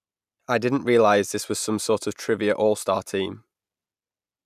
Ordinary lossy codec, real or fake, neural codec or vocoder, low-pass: none; real; none; 14.4 kHz